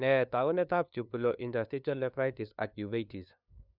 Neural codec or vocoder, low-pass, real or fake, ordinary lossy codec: codec, 16 kHz, 2 kbps, FunCodec, trained on LibriTTS, 25 frames a second; 5.4 kHz; fake; none